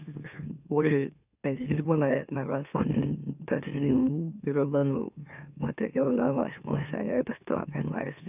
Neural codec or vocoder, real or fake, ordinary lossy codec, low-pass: autoencoder, 44.1 kHz, a latent of 192 numbers a frame, MeloTTS; fake; none; 3.6 kHz